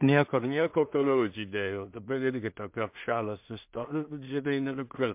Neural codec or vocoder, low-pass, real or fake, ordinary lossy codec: codec, 16 kHz in and 24 kHz out, 0.4 kbps, LongCat-Audio-Codec, two codebook decoder; 3.6 kHz; fake; MP3, 32 kbps